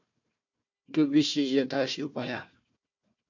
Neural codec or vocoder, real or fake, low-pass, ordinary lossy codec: codec, 16 kHz, 1 kbps, FunCodec, trained on Chinese and English, 50 frames a second; fake; 7.2 kHz; MP3, 64 kbps